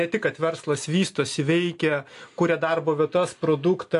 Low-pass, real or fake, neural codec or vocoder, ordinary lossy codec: 10.8 kHz; real; none; AAC, 64 kbps